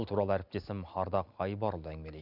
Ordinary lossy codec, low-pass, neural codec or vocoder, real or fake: none; 5.4 kHz; none; real